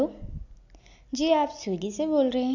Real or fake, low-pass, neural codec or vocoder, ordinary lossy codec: real; 7.2 kHz; none; none